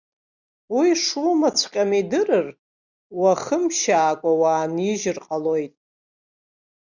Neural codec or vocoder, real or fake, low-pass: none; real; 7.2 kHz